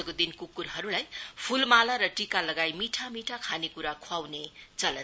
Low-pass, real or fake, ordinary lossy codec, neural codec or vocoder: none; real; none; none